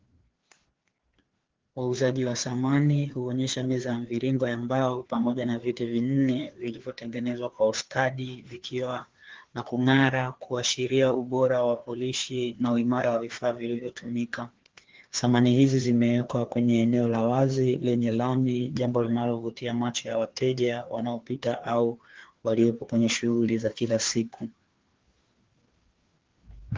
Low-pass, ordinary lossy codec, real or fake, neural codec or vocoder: 7.2 kHz; Opus, 16 kbps; fake; codec, 16 kHz, 2 kbps, FreqCodec, larger model